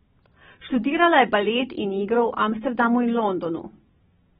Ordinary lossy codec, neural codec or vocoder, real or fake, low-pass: AAC, 16 kbps; none; real; 10.8 kHz